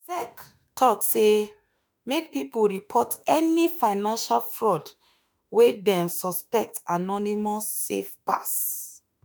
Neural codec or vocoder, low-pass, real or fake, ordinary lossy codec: autoencoder, 48 kHz, 32 numbers a frame, DAC-VAE, trained on Japanese speech; none; fake; none